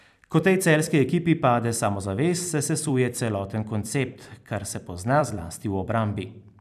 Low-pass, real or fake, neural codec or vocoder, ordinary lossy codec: 14.4 kHz; fake; vocoder, 44.1 kHz, 128 mel bands every 256 samples, BigVGAN v2; none